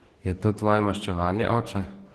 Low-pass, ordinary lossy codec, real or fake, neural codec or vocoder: 14.4 kHz; Opus, 16 kbps; fake; codec, 44.1 kHz, 2.6 kbps, DAC